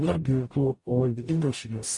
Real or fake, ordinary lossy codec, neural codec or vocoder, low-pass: fake; MP3, 48 kbps; codec, 44.1 kHz, 0.9 kbps, DAC; 10.8 kHz